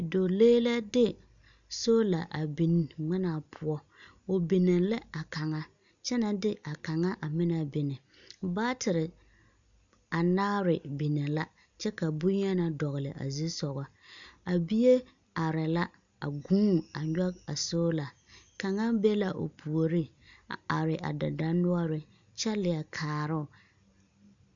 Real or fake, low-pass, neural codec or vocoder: real; 7.2 kHz; none